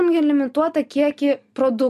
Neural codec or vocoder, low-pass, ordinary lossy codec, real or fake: none; 14.4 kHz; MP3, 64 kbps; real